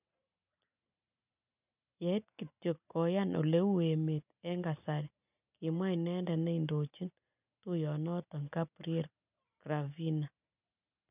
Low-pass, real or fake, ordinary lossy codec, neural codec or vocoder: 3.6 kHz; real; none; none